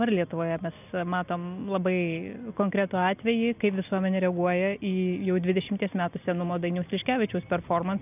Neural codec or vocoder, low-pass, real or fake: none; 3.6 kHz; real